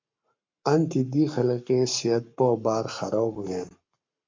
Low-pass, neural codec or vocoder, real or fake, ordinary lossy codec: 7.2 kHz; codec, 44.1 kHz, 7.8 kbps, Pupu-Codec; fake; MP3, 64 kbps